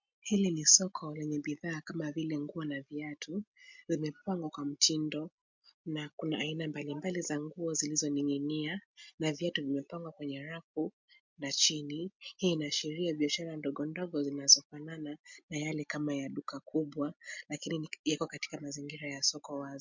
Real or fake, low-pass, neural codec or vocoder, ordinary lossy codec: real; 7.2 kHz; none; MP3, 64 kbps